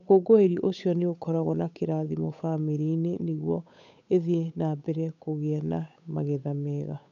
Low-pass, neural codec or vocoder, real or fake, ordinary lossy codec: 7.2 kHz; codec, 16 kHz, 8 kbps, FunCodec, trained on Chinese and English, 25 frames a second; fake; none